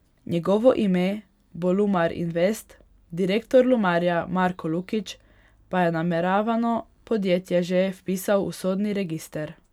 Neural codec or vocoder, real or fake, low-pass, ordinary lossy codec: none; real; 19.8 kHz; none